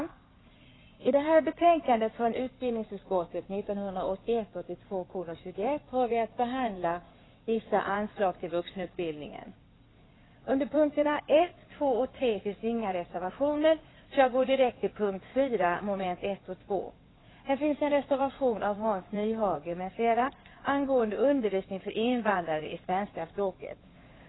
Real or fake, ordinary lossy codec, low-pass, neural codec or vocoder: fake; AAC, 16 kbps; 7.2 kHz; codec, 16 kHz in and 24 kHz out, 2.2 kbps, FireRedTTS-2 codec